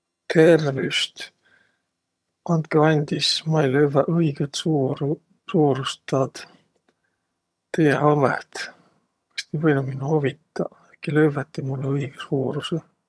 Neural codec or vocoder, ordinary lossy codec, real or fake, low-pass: vocoder, 22.05 kHz, 80 mel bands, HiFi-GAN; none; fake; none